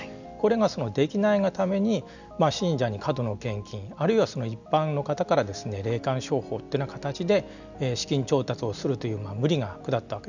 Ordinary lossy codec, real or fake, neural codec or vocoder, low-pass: none; real; none; 7.2 kHz